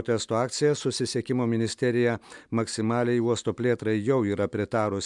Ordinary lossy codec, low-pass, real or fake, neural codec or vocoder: MP3, 96 kbps; 10.8 kHz; real; none